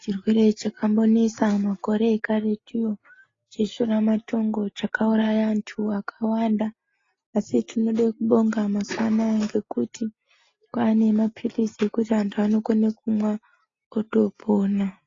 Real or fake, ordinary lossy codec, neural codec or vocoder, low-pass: real; AAC, 32 kbps; none; 7.2 kHz